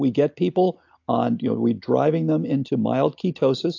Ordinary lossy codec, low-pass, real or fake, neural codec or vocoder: AAC, 48 kbps; 7.2 kHz; real; none